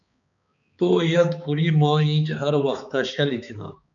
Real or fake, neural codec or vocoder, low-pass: fake; codec, 16 kHz, 4 kbps, X-Codec, HuBERT features, trained on balanced general audio; 7.2 kHz